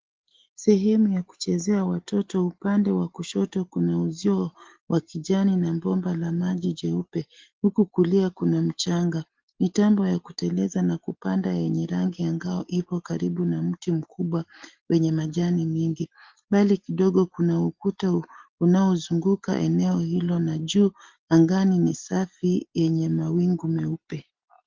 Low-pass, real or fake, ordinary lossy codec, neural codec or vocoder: 7.2 kHz; real; Opus, 16 kbps; none